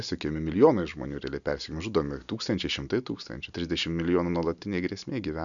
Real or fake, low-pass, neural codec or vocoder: real; 7.2 kHz; none